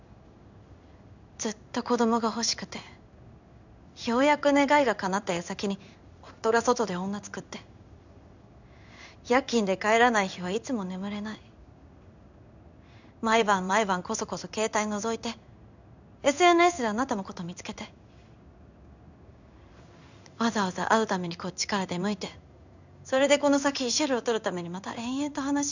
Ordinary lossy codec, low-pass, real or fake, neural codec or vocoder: none; 7.2 kHz; fake; codec, 16 kHz in and 24 kHz out, 1 kbps, XY-Tokenizer